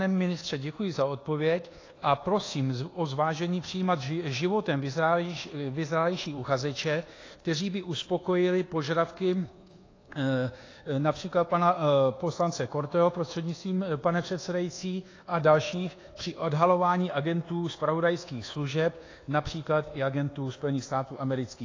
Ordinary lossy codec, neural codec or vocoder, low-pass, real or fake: AAC, 32 kbps; codec, 24 kHz, 1.2 kbps, DualCodec; 7.2 kHz; fake